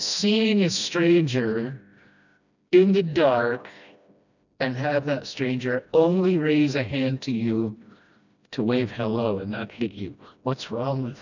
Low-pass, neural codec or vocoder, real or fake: 7.2 kHz; codec, 16 kHz, 1 kbps, FreqCodec, smaller model; fake